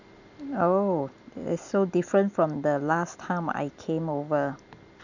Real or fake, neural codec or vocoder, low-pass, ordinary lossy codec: real; none; 7.2 kHz; none